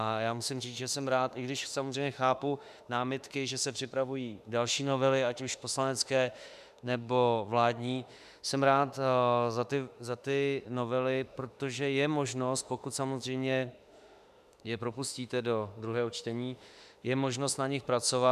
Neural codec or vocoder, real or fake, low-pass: autoencoder, 48 kHz, 32 numbers a frame, DAC-VAE, trained on Japanese speech; fake; 14.4 kHz